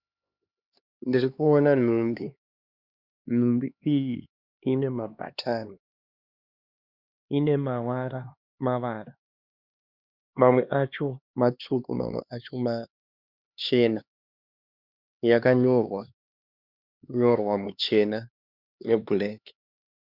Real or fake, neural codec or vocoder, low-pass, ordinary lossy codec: fake; codec, 16 kHz, 2 kbps, X-Codec, HuBERT features, trained on LibriSpeech; 5.4 kHz; Opus, 64 kbps